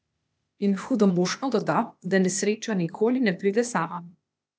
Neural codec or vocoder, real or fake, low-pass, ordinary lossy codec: codec, 16 kHz, 0.8 kbps, ZipCodec; fake; none; none